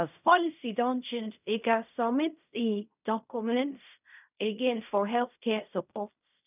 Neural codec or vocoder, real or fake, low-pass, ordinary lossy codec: codec, 16 kHz in and 24 kHz out, 0.4 kbps, LongCat-Audio-Codec, fine tuned four codebook decoder; fake; 3.6 kHz; none